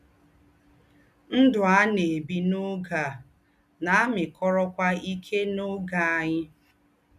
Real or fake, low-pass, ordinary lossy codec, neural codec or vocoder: real; 14.4 kHz; none; none